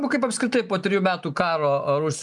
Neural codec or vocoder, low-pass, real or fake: none; 10.8 kHz; real